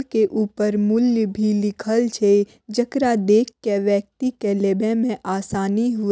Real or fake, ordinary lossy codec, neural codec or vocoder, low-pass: real; none; none; none